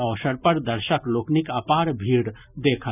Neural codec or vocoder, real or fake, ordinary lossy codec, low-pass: none; real; none; 3.6 kHz